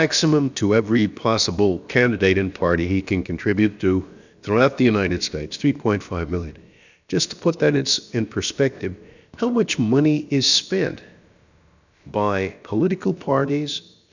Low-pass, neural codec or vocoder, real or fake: 7.2 kHz; codec, 16 kHz, about 1 kbps, DyCAST, with the encoder's durations; fake